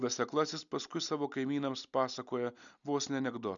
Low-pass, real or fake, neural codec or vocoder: 7.2 kHz; real; none